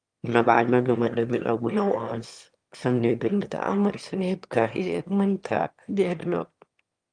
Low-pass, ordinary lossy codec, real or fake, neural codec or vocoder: 9.9 kHz; Opus, 32 kbps; fake; autoencoder, 22.05 kHz, a latent of 192 numbers a frame, VITS, trained on one speaker